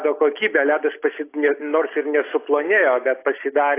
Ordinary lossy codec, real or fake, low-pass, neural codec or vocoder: AAC, 24 kbps; real; 3.6 kHz; none